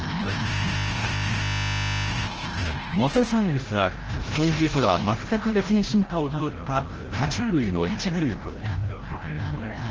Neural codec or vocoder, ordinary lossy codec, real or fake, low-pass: codec, 16 kHz, 0.5 kbps, FreqCodec, larger model; Opus, 16 kbps; fake; 7.2 kHz